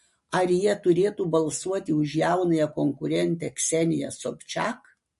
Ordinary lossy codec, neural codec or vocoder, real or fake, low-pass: MP3, 48 kbps; none; real; 14.4 kHz